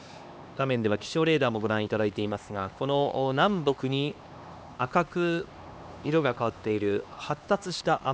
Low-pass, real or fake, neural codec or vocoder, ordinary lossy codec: none; fake; codec, 16 kHz, 2 kbps, X-Codec, HuBERT features, trained on LibriSpeech; none